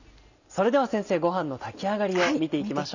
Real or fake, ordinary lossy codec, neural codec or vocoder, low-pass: real; none; none; 7.2 kHz